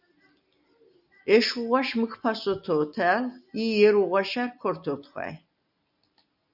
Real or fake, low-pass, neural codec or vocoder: real; 5.4 kHz; none